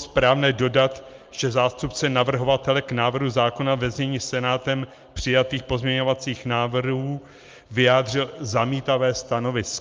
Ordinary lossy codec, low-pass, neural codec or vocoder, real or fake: Opus, 24 kbps; 7.2 kHz; none; real